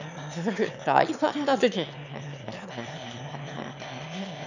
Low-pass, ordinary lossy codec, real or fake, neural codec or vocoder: 7.2 kHz; none; fake; autoencoder, 22.05 kHz, a latent of 192 numbers a frame, VITS, trained on one speaker